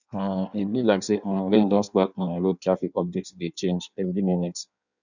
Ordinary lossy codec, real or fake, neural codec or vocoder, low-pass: none; fake; codec, 16 kHz in and 24 kHz out, 1.1 kbps, FireRedTTS-2 codec; 7.2 kHz